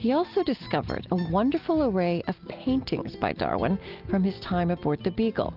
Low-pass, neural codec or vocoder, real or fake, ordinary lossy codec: 5.4 kHz; none; real; Opus, 32 kbps